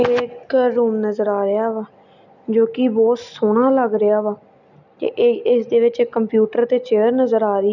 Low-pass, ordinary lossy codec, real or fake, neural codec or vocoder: 7.2 kHz; none; real; none